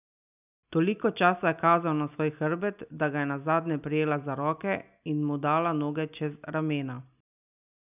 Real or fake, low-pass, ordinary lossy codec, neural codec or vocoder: real; 3.6 kHz; none; none